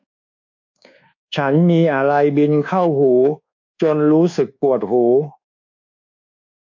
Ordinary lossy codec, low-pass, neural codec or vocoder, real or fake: AAC, 48 kbps; 7.2 kHz; codec, 24 kHz, 1.2 kbps, DualCodec; fake